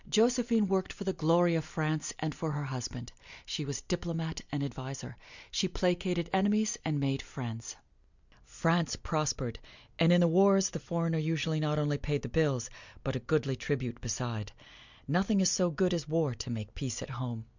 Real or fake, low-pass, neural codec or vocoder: real; 7.2 kHz; none